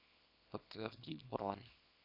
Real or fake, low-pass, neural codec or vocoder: fake; 5.4 kHz; codec, 24 kHz, 0.9 kbps, WavTokenizer, small release